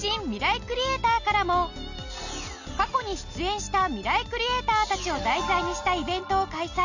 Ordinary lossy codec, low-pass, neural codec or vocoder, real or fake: none; 7.2 kHz; none; real